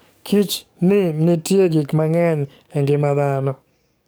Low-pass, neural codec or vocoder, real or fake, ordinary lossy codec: none; codec, 44.1 kHz, 7.8 kbps, DAC; fake; none